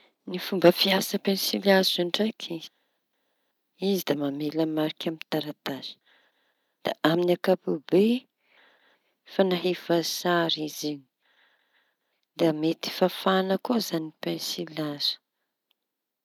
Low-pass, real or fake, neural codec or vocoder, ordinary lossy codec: 19.8 kHz; real; none; none